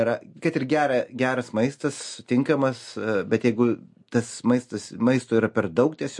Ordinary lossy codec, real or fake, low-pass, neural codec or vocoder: MP3, 48 kbps; real; 10.8 kHz; none